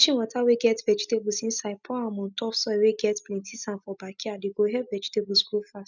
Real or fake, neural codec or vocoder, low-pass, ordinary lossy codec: real; none; 7.2 kHz; none